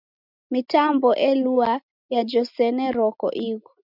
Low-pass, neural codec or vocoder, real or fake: 5.4 kHz; none; real